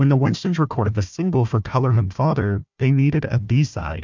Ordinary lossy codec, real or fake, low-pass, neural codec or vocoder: MP3, 64 kbps; fake; 7.2 kHz; codec, 16 kHz, 1 kbps, FunCodec, trained on Chinese and English, 50 frames a second